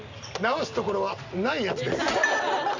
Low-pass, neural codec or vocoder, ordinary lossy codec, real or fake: 7.2 kHz; none; Opus, 64 kbps; real